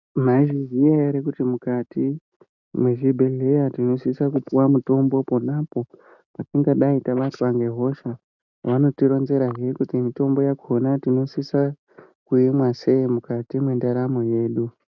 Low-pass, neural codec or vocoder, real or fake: 7.2 kHz; none; real